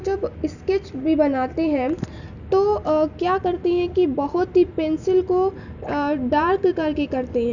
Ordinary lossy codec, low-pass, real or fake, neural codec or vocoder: none; 7.2 kHz; real; none